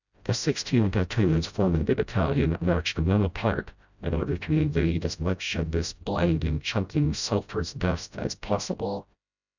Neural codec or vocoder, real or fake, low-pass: codec, 16 kHz, 0.5 kbps, FreqCodec, smaller model; fake; 7.2 kHz